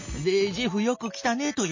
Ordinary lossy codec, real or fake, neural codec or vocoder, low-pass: MP3, 32 kbps; real; none; 7.2 kHz